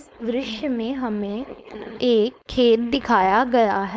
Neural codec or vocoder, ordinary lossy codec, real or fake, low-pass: codec, 16 kHz, 4.8 kbps, FACodec; none; fake; none